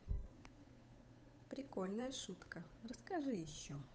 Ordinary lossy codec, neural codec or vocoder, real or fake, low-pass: none; codec, 16 kHz, 8 kbps, FunCodec, trained on Chinese and English, 25 frames a second; fake; none